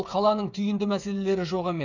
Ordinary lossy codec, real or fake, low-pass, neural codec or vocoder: none; fake; 7.2 kHz; codec, 16 kHz, 8 kbps, FreqCodec, smaller model